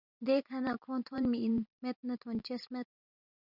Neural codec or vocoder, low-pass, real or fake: vocoder, 44.1 kHz, 128 mel bands every 512 samples, BigVGAN v2; 5.4 kHz; fake